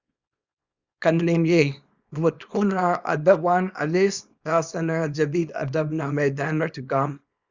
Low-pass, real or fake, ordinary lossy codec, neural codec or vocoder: 7.2 kHz; fake; Opus, 64 kbps; codec, 24 kHz, 0.9 kbps, WavTokenizer, small release